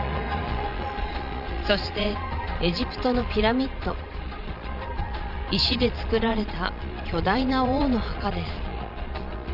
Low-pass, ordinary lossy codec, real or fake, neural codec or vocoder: 5.4 kHz; none; fake; vocoder, 22.05 kHz, 80 mel bands, Vocos